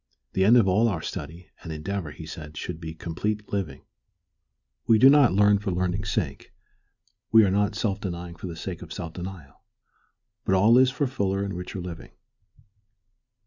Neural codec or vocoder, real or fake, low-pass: none; real; 7.2 kHz